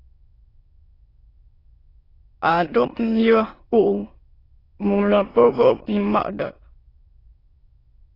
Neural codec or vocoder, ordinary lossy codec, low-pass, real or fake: autoencoder, 22.05 kHz, a latent of 192 numbers a frame, VITS, trained on many speakers; AAC, 24 kbps; 5.4 kHz; fake